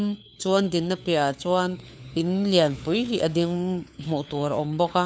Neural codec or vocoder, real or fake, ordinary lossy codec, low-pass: codec, 16 kHz, 4 kbps, FunCodec, trained on LibriTTS, 50 frames a second; fake; none; none